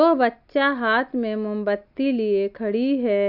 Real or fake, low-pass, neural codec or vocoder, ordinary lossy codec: real; 5.4 kHz; none; AAC, 48 kbps